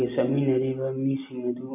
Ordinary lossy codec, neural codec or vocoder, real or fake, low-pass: MP3, 32 kbps; none; real; 3.6 kHz